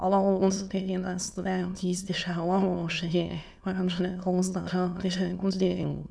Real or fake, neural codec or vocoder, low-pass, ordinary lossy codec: fake; autoencoder, 22.05 kHz, a latent of 192 numbers a frame, VITS, trained on many speakers; none; none